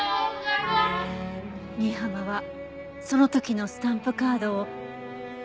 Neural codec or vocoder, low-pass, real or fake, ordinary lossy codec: none; none; real; none